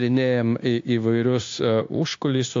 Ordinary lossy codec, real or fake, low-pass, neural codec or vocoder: AAC, 48 kbps; fake; 7.2 kHz; codec, 16 kHz, 0.9 kbps, LongCat-Audio-Codec